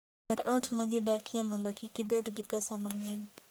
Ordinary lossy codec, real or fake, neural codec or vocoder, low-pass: none; fake; codec, 44.1 kHz, 1.7 kbps, Pupu-Codec; none